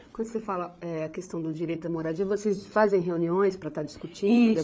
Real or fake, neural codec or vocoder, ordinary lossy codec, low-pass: fake; codec, 16 kHz, 8 kbps, FreqCodec, larger model; none; none